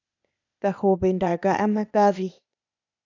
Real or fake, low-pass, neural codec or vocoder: fake; 7.2 kHz; codec, 16 kHz, 0.8 kbps, ZipCodec